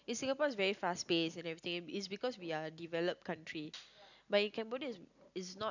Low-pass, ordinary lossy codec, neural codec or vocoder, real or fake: 7.2 kHz; none; none; real